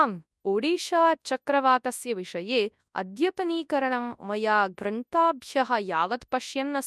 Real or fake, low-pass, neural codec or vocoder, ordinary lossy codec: fake; none; codec, 24 kHz, 0.9 kbps, WavTokenizer, large speech release; none